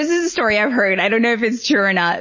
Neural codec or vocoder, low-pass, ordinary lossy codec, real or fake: none; 7.2 kHz; MP3, 32 kbps; real